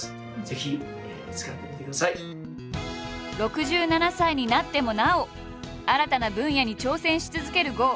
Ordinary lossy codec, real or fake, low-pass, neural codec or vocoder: none; real; none; none